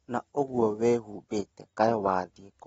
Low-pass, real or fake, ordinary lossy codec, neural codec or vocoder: 19.8 kHz; real; AAC, 24 kbps; none